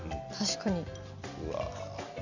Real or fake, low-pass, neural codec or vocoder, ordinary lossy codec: real; 7.2 kHz; none; none